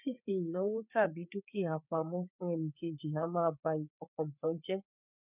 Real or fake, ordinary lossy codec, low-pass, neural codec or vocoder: fake; none; 3.6 kHz; codec, 16 kHz, 4 kbps, FreqCodec, larger model